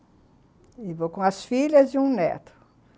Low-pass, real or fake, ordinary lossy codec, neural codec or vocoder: none; real; none; none